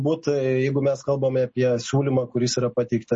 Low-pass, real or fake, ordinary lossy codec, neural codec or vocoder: 10.8 kHz; real; MP3, 32 kbps; none